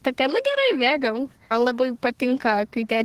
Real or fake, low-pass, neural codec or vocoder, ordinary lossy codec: fake; 14.4 kHz; codec, 32 kHz, 1.9 kbps, SNAC; Opus, 16 kbps